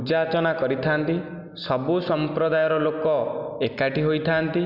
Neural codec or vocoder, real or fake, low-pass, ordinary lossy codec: none; real; 5.4 kHz; none